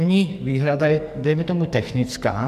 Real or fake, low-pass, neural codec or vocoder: fake; 14.4 kHz; codec, 44.1 kHz, 2.6 kbps, SNAC